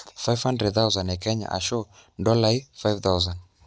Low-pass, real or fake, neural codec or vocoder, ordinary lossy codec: none; real; none; none